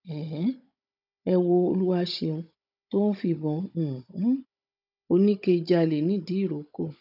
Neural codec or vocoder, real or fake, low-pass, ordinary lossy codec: codec, 16 kHz, 16 kbps, FunCodec, trained on Chinese and English, 50 frames a second; fake; 5.4 kHz; none